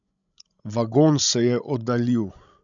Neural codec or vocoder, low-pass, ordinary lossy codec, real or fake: codec, 16 kHz, 16 kbps, FreqCodec, larger model; 7.2 kHz; none; fake